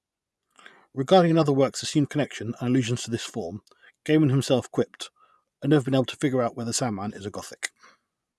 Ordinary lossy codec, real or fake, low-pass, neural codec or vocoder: none; fake; none; vocoder, 24 kHz, 100 mel bands, Vocos